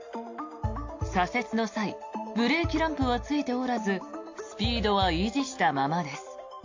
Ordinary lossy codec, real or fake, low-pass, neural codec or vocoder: AAC, 48 kbps; real; 7.2 kHz; none